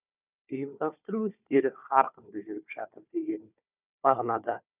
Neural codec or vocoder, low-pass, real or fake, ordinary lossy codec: codec, 16 kHz, 4 kbps, FunCodec, trained on Chinese and English, 50 frames a second; 3.6 kHz; fake; none